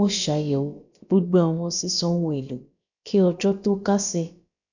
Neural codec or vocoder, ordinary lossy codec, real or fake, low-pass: codec, 16 kHz, about 1 kbps, DyCAST, with the encoder's durations; none; fake; 7.2 kHz